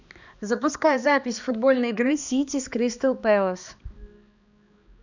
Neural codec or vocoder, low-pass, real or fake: codec, 16 kHz, 2 kbps, X-Codec, HuBERT features, trained on balanced general audio; 7.2 kHz; fake